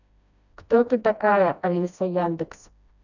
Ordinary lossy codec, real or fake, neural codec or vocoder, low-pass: none; fake; codec, 16 kHz, 1 kbps, FreqCodec, smaller model; 7.2 kHz